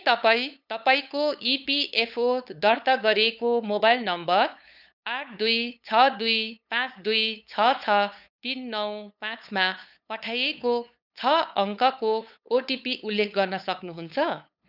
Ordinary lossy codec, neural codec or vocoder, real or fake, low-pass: none; codec, 16 kHz, 8 kbps, FunCodec, trained on LibriTTS, 25 frames a second; fake; 5.4 kHz